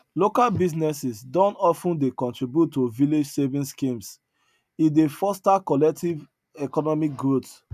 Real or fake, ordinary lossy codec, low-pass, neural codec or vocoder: real; none; 14.4 kHz; none